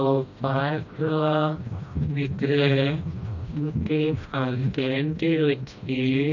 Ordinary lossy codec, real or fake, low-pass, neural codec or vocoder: none; fake; 7.2 kHz; codec, 16 kHz, 1 kbps, FreqCodec, smaller model